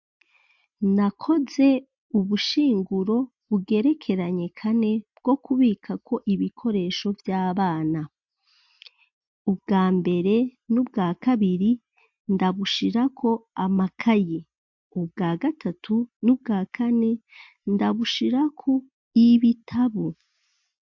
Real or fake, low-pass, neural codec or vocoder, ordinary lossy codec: real; 7.2 kHz; none; MP3, 48 kbps